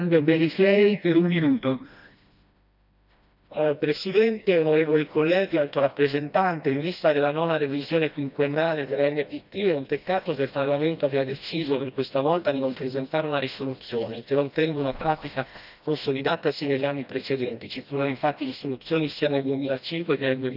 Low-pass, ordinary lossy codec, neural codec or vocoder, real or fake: 5.4 kHz; none; codec, 16 kHz, 1 kbps, FreqCodec, smaller model; fake